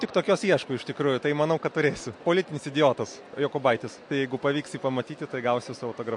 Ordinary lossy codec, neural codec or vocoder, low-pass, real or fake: MP3, 48 kbps; none; 10.8 kHz; real